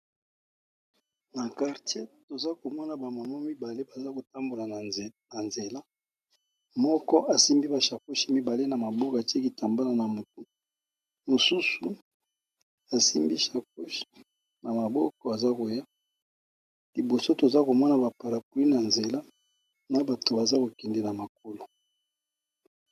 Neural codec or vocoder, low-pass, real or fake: none; 14.4 kHz; real